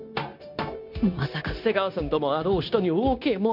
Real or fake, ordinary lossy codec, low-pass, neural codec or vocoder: fake; none; 5.4 kHz; codec, 16 kHz, 0.9 kbps, LongCat-Audio-Codec